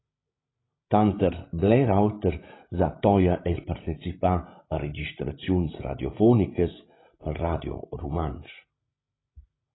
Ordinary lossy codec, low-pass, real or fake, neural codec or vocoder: AAC, 16 kbps; 7.2 kHz; fake; codec, 16 kHz, 16 kbps, FreqCodec, larger model